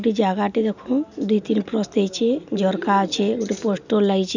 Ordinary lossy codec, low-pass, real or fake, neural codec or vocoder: none; 7.2 kHz; real; none